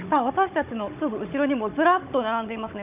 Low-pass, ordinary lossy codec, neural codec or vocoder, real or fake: 3.6 kHz; none; codec, 16 kHz, 16 kbps, FunCodec, trained on Chinese and English, 50 frames a second; fake